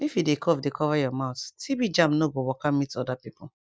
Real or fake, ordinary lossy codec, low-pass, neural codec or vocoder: real; none; none; none